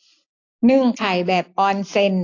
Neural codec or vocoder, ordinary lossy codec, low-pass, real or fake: none; AAC, 48 kbps; 7.2 kHz; real